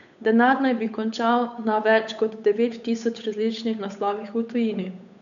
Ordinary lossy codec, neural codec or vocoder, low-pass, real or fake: none; codec, 16 kHz, 8 kbps, FunCodec, trained on Chinese and English, 25 frames a second; 7.2 kHz; fake